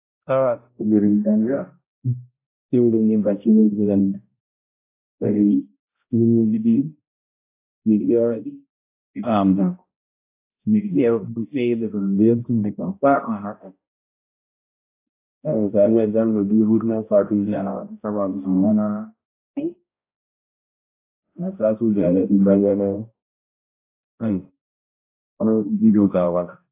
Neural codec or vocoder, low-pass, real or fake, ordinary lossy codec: codec, 16 kHz, 0.5 kbps, X-Codec, HuBERT features, trained on balanced general audio; 3.6 kHz; fake; AAC, 24 kbps